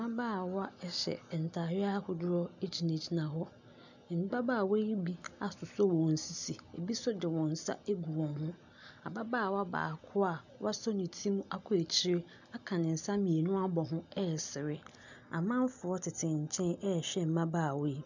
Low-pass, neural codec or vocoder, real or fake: 7.2 kHz; none; real